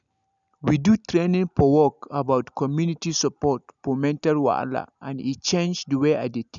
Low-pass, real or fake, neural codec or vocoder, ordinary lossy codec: 7.2 kHz; real; none; none